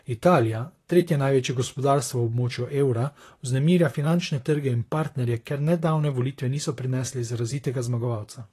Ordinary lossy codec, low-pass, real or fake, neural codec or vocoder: AAC, 48 kbps; 14.4 kHz; fake; vocoder, 44.1 kHz, 128 mel bands, Pupu-Vocoder